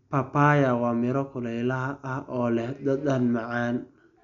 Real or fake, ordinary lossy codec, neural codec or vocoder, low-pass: real; none; none; 7.2 kHz